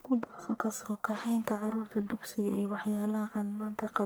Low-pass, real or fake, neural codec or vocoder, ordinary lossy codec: none; fake; codec, 44.1 kHz, 1.7 kbps, Pupu-Codec; none